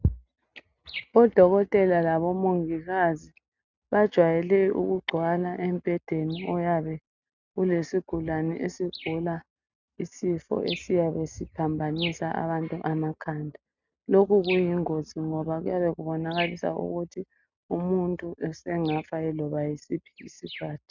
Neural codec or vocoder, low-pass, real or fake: none; 7.2 kHz; real